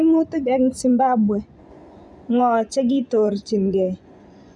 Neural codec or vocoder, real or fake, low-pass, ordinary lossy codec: vocoder, 24 kHz, 100 mel bands, Vocos; fake; none; none